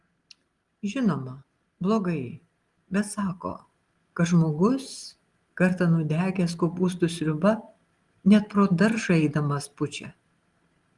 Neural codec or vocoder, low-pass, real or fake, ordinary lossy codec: none; 10.8 kHz; real; Opus, 24 kbps